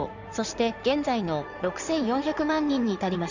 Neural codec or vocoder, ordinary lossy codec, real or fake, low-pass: codec, 16 kHz in and 24 kHz out, 2.2 kbps, FireRedTTS-2 codec; none; fake; 7.2 kHz